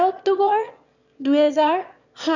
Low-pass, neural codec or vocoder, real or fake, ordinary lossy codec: 7.2 kHz; autoencoder, 22.05 kHz, a latent of 192 numbers a frame, VITS, trained on one speaker; fake; none